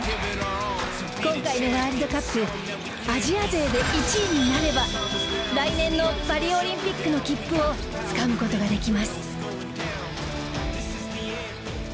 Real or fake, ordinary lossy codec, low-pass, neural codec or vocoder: real; none; none; none